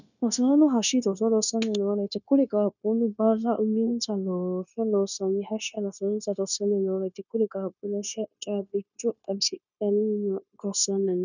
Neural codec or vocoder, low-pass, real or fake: codec, 16 kHz, 0.9 kbps, LongCat-Audio-Codec; 7.2 kHz; fake